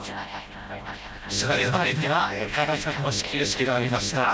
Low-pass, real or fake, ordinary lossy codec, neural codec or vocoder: none; fake; none; codec, 16 kHz, 0.5 kbps, FreqCodec, smaller model